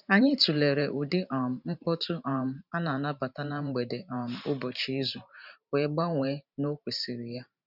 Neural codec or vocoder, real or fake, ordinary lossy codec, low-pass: vocoder, 44.1 kHz, 128 mel bands every 512 samples, BigVGAN v2; fake; none; 5.4 kHz